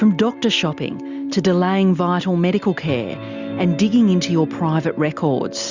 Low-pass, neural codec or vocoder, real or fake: 7.2 kHz; none; real